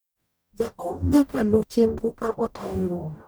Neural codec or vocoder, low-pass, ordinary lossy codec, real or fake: codec, 44.1 kHz, 0.9 kbps, DAC; none; none; fake